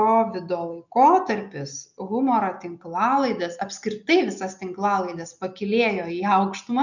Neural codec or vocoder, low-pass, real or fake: none; 7.2 kHz; real